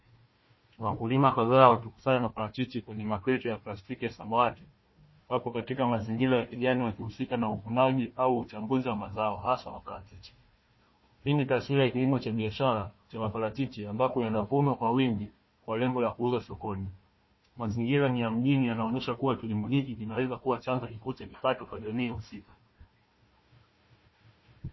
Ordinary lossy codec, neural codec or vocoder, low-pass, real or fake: MP3, 24 kbps; codec, 16 kHz, 1 kbps, FunCodec, trained on Chinese and English, 50 frames a second; 7.2 kHz; fake